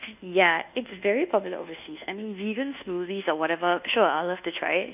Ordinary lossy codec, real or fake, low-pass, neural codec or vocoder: none; fake; 3.6 kHz; codec, 24 kHz, 1.2 kbps, DualCodec